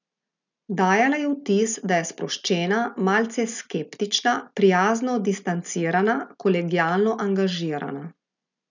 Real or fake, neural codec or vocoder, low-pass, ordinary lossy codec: real; none; 7.2 kHz; none